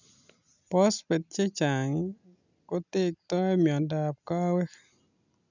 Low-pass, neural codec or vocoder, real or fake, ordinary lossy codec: 7.2 kHz; none; real; none